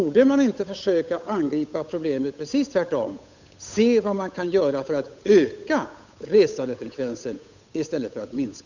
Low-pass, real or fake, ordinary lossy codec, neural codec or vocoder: 7.2 kHz; fake; none; codec, 16 kHz, 8 kbps, FunCodec, trained on Chinese and English, 25 frames a second